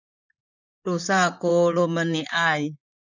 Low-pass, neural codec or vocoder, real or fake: 7.2 kHz; vocoder, 44.1 kHz, 128 mel bands, Pupu-Vocoder; fake